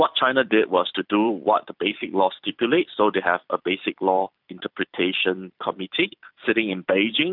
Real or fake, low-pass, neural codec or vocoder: fake; 5.4 kHz; vocoder, 44.1 kHz, 128 mel bands every 256 samples, BigVGAN v2